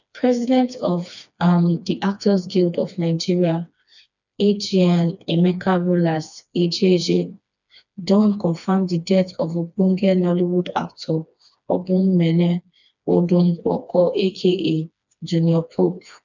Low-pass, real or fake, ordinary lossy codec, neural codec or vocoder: 7.2 kHz; fake; none; codec, 16 kHz, 2 kbps, FreqCodec, smaller model